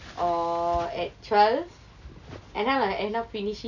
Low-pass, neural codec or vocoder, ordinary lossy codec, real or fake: 7.2 kHz; none; none; real